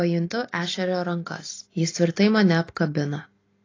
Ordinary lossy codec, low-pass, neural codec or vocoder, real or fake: AAC, 32 kbps; 7.2 kHz; none; real